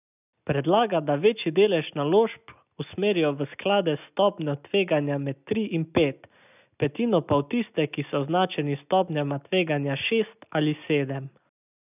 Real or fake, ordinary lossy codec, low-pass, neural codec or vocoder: real; none; 3.6 kHz; none